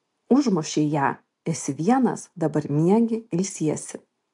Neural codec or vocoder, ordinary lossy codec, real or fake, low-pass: none; AAC, 64 kbps; real; 10.8 kHz